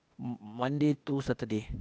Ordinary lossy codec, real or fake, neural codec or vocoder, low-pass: none; fake; codec, 16 kHz, 0.8 kbps, ZipCodec; none